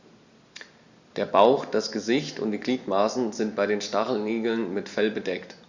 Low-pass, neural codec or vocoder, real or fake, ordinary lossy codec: 7.2 kHz; none; real; Opus, 64 kbps